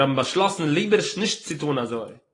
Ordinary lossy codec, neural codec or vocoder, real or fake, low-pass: AAC, 32 kbps; none; real; 10.8 kHz